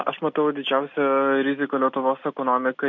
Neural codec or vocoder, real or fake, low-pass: none; real; 7.2 kHz